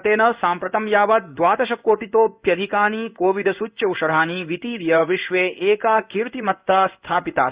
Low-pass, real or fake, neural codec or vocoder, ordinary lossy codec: 3.6 kHz; fake; codec, 16 kHz in and 24 kHz out, 1 kbps, XY-Tokenizer; Opus, 64 kbps